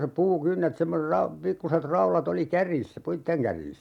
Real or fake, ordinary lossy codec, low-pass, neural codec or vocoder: real; none; 19.8 kHz; none